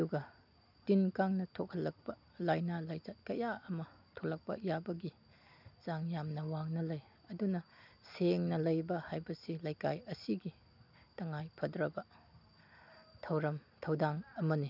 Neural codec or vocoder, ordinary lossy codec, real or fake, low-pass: none; none; real; 5.4 kHz